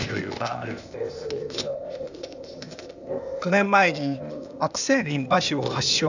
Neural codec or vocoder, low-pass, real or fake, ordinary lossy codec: codec, 16 kHz, 0.8 kbps, ZipCodec; 7.2 kHz; fake; none